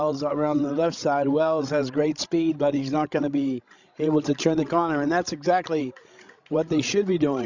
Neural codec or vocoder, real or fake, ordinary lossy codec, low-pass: codec, 16 kHz, 16 kbps, FreqCodec, larger model; fake; Opus, 64 kbps; 7.2 kHz